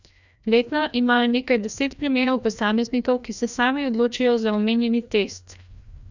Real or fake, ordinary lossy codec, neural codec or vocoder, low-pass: fake; none; codec, 16 kHz, 1 kbps, FreqCodec, larger model; 7.2 kHz